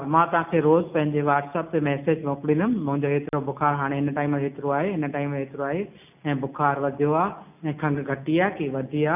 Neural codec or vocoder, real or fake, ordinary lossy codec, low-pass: none; real; none; 3.6 kHz